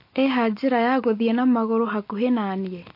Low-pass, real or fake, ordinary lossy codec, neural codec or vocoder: 5.4 kHz; real; MP3, 32 kbps; none